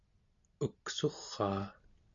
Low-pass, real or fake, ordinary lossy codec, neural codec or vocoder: 7.2 kHz; real; AAC, 64 kbps; none